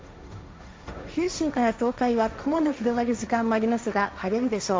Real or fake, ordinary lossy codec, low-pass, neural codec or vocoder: fake; none; none; codec, 16 kHz, 1.1 kbps, Voila-Tokenizer